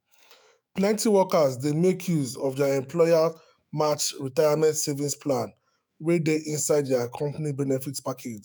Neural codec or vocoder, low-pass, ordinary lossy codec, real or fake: autoencoder, 48 kHz, 128 numbers a frame, DAC-VAE, trained on Japanese speech; none; none; fake